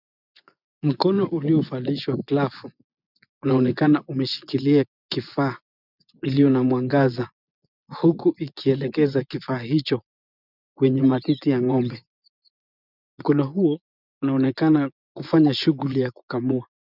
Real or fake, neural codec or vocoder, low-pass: fake; vocoder, 24 kHz, 100 mel bands, Vocos; 5.4 kHz